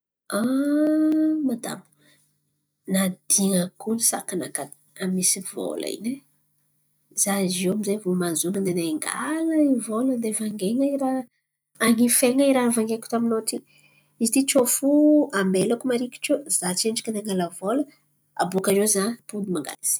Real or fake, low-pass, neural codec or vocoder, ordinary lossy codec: real; none; none; none